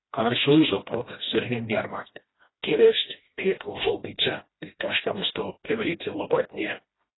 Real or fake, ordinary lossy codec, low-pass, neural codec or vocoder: fake; AAC, 16 kbps; 7.2 kHz; codec, 16 kHz, 1 kbps, FreqCodec, smaller model